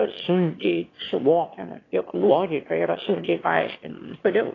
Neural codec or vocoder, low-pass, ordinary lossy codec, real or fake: autoencoder, 22.05 kHz, a latent of 192 numbers a frame, VITS, trained on one speaker; 7.2 kHz; AAC, 32 kbps; fake